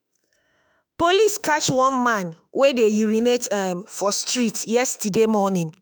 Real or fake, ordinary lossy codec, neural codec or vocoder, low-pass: fake; none; autoencoder, 48 kHz, 32 numbers a frame, DAC-VAE, trained on Japanese speech; none